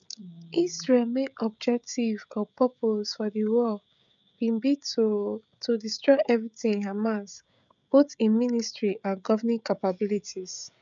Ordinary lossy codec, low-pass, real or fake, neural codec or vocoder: none; 7.2 kHz; fake; codec, 16 kHz, 16 kbps, FreqCodec, smaller model